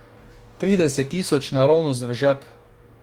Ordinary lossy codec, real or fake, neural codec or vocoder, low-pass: Opus, 32 kbps; fake; codec, 44.1 kHz, 2.6 kbps, DAC; 19.8 kHz